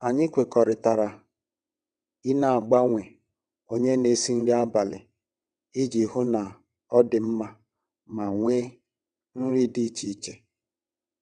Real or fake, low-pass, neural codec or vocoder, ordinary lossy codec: fake; 9.9 kHz; vocoder, 22.05 kHz, 80 mel bands, WaveNeXt; none